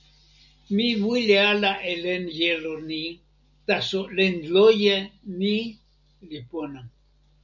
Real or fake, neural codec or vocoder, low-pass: real; none; 7.2 kHz